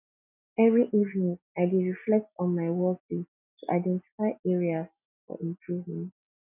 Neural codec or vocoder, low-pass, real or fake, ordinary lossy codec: none; 3.6 kHz; real; none